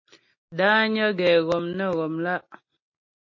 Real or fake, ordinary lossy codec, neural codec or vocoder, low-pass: real; MP3, 32 kbps; none; 7.2 kHz